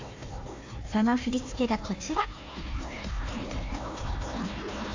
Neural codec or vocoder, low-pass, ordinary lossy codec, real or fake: codec, 16 kHz, 1 kbps, FunCodec, trained on Chinese and English, 50 frames a second; 7.2 kHz; AAC, 48 kbps; fake